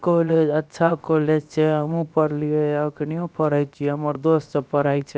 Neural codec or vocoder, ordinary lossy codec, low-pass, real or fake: codec, 16 kHz, 0.7 kbps, FocalCodec; none; none; fake